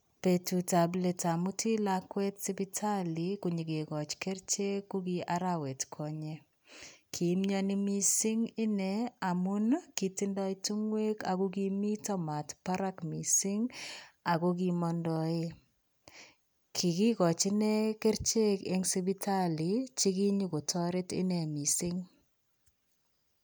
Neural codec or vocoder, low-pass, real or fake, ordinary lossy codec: none; none; real; none